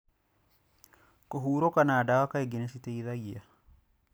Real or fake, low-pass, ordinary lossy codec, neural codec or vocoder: real; none; none; none